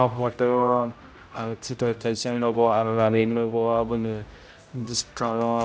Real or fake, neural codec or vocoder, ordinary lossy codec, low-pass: fake; codec, 16 kHz, 0.5 kbps, X-Codec, HuBERT features, trained on general audio; none; none